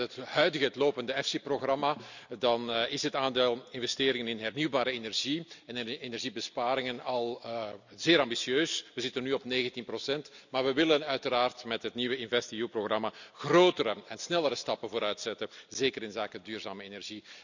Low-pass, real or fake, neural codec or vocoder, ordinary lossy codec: 7.2 kHz; real; none; none